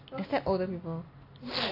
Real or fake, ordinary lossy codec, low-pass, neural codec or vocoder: real; none; 5.4 kHz; none